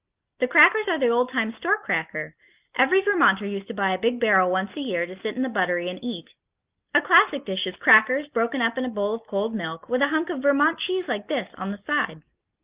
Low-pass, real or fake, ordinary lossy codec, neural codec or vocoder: 3.6 kHz; real; Opus, 24 kbps; none